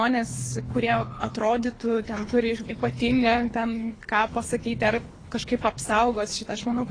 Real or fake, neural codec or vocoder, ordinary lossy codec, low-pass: fake; codec, 24 kHz, 3 kbps, HILCodec; AAC, 32 kbps; 9.9 kHz